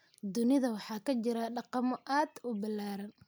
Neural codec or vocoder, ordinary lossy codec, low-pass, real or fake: none; none; none; real